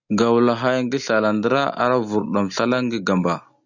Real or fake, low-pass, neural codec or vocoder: real; 7.2 kHz; none